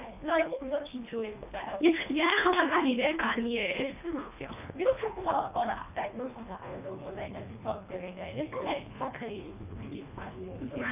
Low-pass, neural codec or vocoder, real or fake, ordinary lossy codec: 3.6 kHz; codec, 24 kHz, 1.5 kbps, HILCodec; fake; none